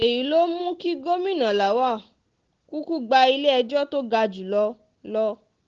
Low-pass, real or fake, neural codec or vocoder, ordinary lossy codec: 7.2 kHz; real; none; Opus, 16 kbps